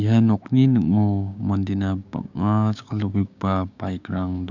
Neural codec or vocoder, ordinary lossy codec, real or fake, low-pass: codec, 16 kHz, 6 kbps, DAC; none; fake; 7.2 kHz